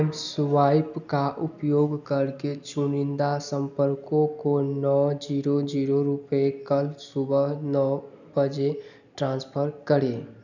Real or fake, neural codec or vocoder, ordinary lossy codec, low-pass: real; none; none; 7.2 kHz